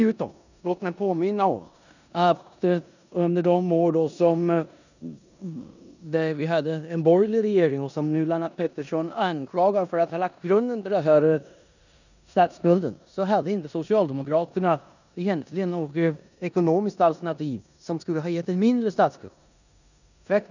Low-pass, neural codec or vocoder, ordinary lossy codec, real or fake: 7.2 kHz; codec, 16 kHz in and 24 kHz out, 0.9 kbps, LongCat-Audio-Codec, four codebook decoder; none; fake